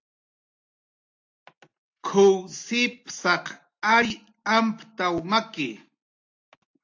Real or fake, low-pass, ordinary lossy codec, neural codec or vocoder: real; 7.2 kHz; AAC, 48 kbps; none